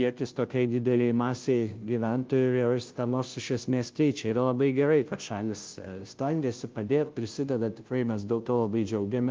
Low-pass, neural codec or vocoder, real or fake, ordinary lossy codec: 7.2 kHz; codec, 16 kHz, 0.5 kbps, FunCodec, trained on Chinese and English, 25 frames a second; fake; Opus, 32 kbps